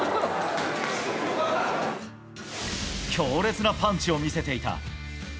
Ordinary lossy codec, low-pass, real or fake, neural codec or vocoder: none; none; real; none